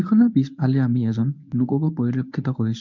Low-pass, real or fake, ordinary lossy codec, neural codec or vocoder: 7.2 kHz; fake; MP3, 64 kbps; codec, 24 kHz, 0.9 kbps, WavTokenizer, medium speech release version 1